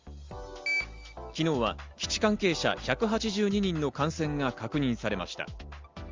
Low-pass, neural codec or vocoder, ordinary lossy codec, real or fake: 7.2 kHz; none; Opus, 32 kbps; real